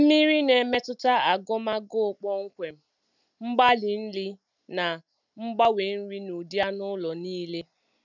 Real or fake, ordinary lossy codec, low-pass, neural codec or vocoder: real; none; 7.2 kHz; none